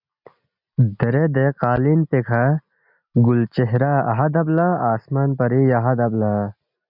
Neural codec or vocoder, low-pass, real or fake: none; 5.4 kHz; real